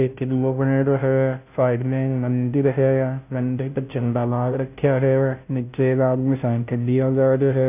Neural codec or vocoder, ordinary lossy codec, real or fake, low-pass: codec, 16 kHz, 0.5 kbps, FunCodec, trained on Chinese and English, 25 frames a second; none; fake; 3.6 kHz